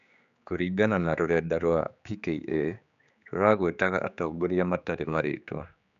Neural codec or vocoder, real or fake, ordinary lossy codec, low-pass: codec, 16 kHz, 4 kbps, X-Codec, HuBERT features, trained on general audio; fake; none; 7.2 kHz